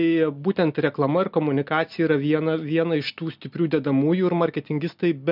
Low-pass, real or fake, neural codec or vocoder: 5.4 kHz; real; none